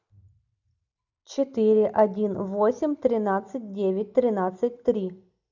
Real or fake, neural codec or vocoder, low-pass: real; none; 7.2 kHz